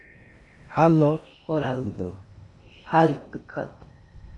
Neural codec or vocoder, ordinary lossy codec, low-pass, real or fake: codec, 16 kHz in and 24 kHz out, 0.6 kbps, FocalCodec, streaming, 4096 codes; AAC, 64 kbps; 10.8 kHz; fake